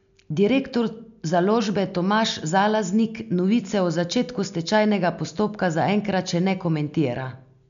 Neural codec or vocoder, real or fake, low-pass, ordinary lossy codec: none; real; 7.2 kHz; none